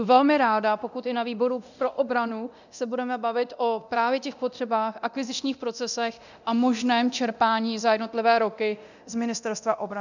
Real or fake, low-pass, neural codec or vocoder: fake; 7.2 kHz; codec, 24 kHz, 0.9 kbps, DualCodec